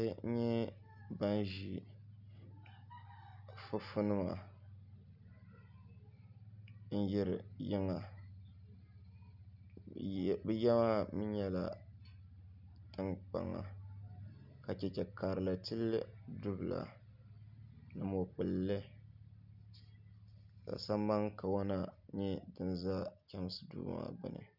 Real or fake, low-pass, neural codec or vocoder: real; 5.4 kHz; none